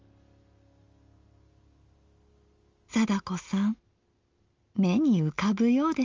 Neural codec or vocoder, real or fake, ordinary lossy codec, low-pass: none; real; Opus, 32 kbps; 7.2 kHz